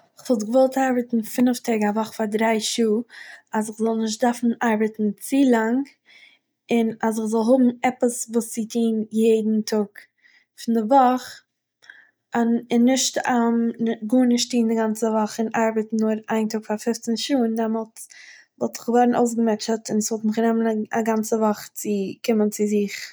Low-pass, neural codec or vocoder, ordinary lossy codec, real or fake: none; none; none; real